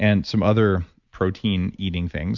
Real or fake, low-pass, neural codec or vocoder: real; 7.2 kHz; none